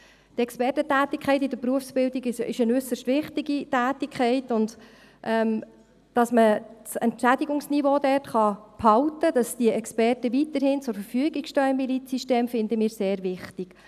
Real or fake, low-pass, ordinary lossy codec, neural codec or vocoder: real; 14.4 kHz; none; none